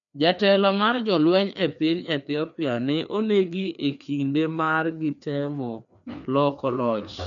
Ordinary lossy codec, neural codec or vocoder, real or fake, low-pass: none; codec, 16 kHz, 2 kbps, FreqCodec, larger model; fake; 7.2 kHz